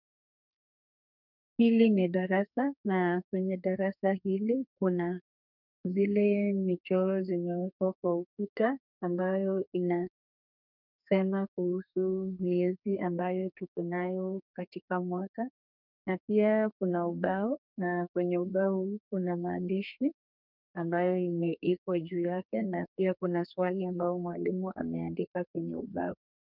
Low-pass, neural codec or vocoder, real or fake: 5.4 kHz; codec, 32 kHz, 1.9 kbps, SNAC; fake